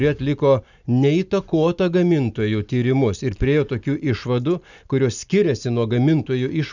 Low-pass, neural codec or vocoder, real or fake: 7.2 kHz; none; real